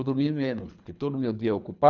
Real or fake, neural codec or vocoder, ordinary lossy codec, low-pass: fake; codec, 24 kHz, 3 kbps, HILCodec; none; 7.2 kHz